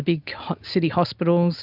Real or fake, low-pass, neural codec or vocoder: real; 5.4 kHz; none